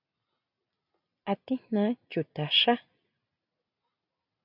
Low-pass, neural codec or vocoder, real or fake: 5.4 kHz; none; real